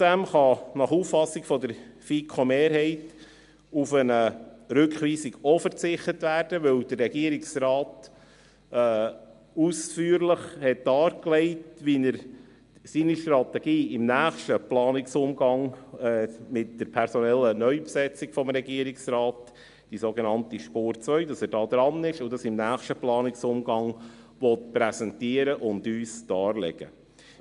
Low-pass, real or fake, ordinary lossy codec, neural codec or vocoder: 10.8 kHz; real; MP3, 64 kbps; none